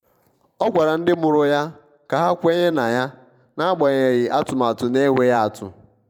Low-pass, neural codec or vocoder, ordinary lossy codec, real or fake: 19.8 kHz; vocoder, 44.1 kHz, 128 mel bands every 512 samples, BigVGAN v2; none; fake